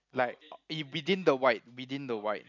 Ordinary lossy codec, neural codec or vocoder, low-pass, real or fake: none; none; 7.2 kHz; real